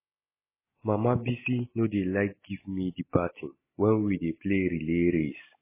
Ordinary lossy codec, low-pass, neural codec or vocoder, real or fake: MP3, 16 kbps; 3.6 kHz; none; real